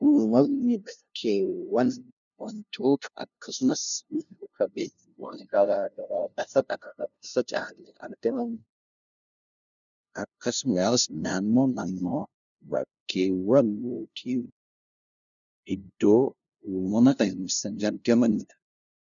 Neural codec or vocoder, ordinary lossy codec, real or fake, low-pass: codec, 16 kHz, 0.5 kbps, FunCodec, trained on LibriTTS, 25 frames a second; MP3, 96 kbps; fake; 7.2 kHz